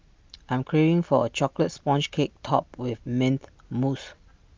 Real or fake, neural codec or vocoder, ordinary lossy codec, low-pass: real; none; Opus, 24 kbps; 7.2 kHz